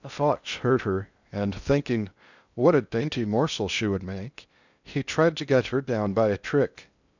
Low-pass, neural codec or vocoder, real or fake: 7.2 kHz; codec, 16 kHz in and 24 kHz out, 0.6 kbps, FocalCodec, streaming, 2048 codes; fake